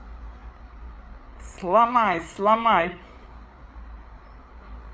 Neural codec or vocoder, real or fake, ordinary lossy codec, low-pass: codec, 16 kHz, 8 kbps, FreqCodec, larger model; fake; none; none